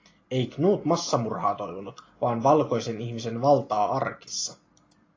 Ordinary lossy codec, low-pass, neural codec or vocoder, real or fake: AAC, 32 kbps; 7.2 kHz; none; real